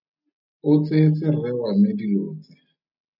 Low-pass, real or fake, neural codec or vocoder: 5.4 kHz; real; none